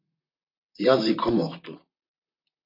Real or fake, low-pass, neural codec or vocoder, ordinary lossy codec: fake; 5.4 kHz; vocoder, 44.1 kHz, 128 mel bands, Pupu-Vocoder; MP3, 32 kbps